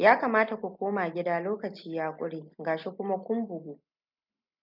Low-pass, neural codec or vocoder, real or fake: 5.4 kHz; none; real